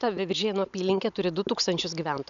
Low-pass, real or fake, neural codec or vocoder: 7.2 kHz; real; none